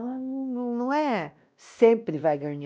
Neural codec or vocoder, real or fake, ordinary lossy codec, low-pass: codec, 16 kHz, 1 kbps, X-Codec, WavLM features, trained on Multilingual LibriSpeech; fake; none; none